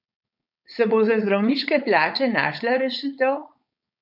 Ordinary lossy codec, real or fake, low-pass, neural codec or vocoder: none; fake; 5.4 kHz; codec, 16 kHz, 4.8 kbps, FACodec